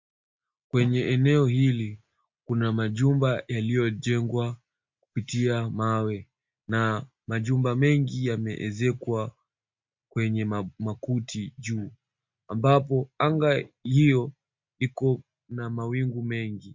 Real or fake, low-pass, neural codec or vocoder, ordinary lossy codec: real; 7.2 kHz; none; MP3, 48 kbps